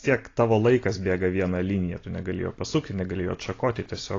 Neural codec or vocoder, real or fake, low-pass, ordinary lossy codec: none; real; 7.2 kHz; AAC, 32 kbps